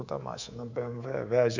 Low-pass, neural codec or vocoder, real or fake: 7.2 kHz; codec, 24 kHz, 3.1 kbps, DualCodec; fake